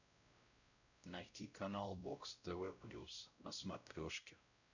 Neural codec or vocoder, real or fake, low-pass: codec, 16 kHz, 0.5 kbps, X-Codec, WavLM features, trained on Multilingual LibriSpeech; fake; 7.2 kHz